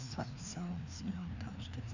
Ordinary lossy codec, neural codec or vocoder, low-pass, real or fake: none; codec, 16 kHz, 2 kbps, FreqCodec, larger model; 7.2 kHz; fake